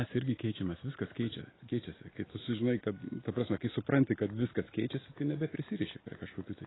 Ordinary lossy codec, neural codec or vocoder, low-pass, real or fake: AAC, 16 kbps; none; 7.2 kHz; real